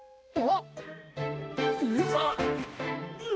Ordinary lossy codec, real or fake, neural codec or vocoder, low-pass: none; fake; codec, 16 kHz, 1 kbps, X-Codec, HuBERT features, trained on balanced general audio; none